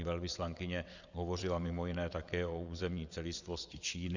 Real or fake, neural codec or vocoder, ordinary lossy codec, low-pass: fake; vocoder, 22.05 kHz, 80 mel bands, WaveNeXt; Opus, 64 kbps; 7.2 kHz